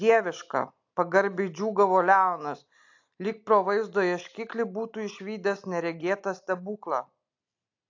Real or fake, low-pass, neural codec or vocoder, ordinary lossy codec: real; 7.2 kHz; none; AAC, 48 kbps